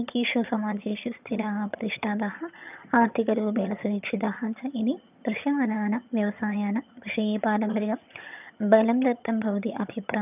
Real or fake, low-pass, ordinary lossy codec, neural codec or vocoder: fake; 3.6 kHz; none; vocoder, 22.05 kHz, 80 mel bands, HiFi-GAN